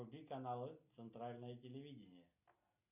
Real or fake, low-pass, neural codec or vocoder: real; 3.6 kHz; none